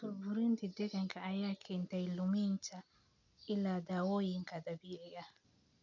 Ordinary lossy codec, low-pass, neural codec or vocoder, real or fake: none; 7.2 kHz; none; real